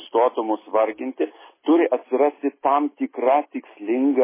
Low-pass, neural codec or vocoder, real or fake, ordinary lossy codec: 3.6 kHz; none; real; MP3, 16 kbps